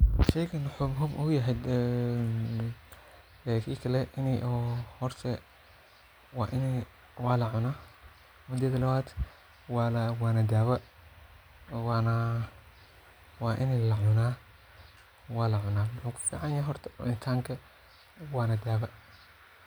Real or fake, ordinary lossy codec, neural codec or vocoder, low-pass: real; none; none; none